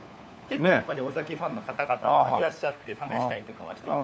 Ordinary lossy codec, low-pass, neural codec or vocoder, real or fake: none; none; codec, 16 kHz, 4 kbps, FunCodec, trained on LibriTTS, 50 frames a second; fake